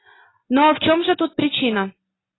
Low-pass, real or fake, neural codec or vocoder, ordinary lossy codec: 7.2 kHz; real; none; AAC, 16 kbps